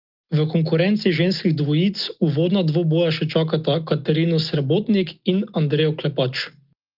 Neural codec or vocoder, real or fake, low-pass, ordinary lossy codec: none; real; 5.4 kHz; Opus, 32 kbps